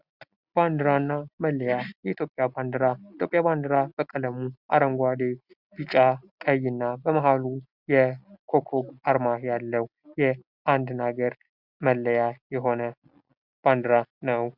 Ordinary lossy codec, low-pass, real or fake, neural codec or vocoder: Opus, 64 kbps; 5.4 kHz; real; none